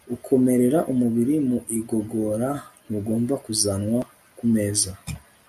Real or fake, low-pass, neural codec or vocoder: real; 14.4 kHz; none